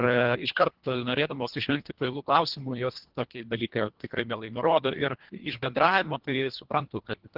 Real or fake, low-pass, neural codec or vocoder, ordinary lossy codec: fake; 5.4 kHz; codec, 24 kHz, 1.5 kbps, HILCodec; Opus, 16 kbps